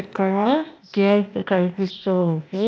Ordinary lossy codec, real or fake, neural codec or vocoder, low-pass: none; fake; codec, 16 kHz, 0.8 kbps, ZipCodec; none